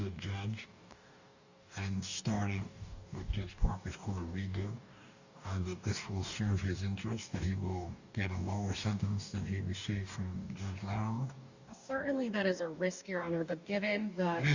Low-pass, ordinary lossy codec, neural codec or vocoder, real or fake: 7.2 kHz; Opus, 64 kbps; codec, 44.1 kHz, 2.6 kbps, DAC; fake